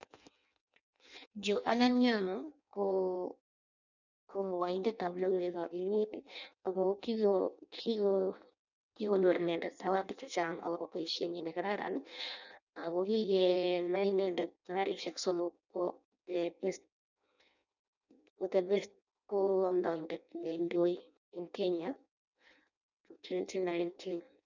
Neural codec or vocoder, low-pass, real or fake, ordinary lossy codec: codec, 16 kHz in and 24 kHz out, 0.6 kbps, FireRedTTS-2 codec; 7.2 kHz; fake; none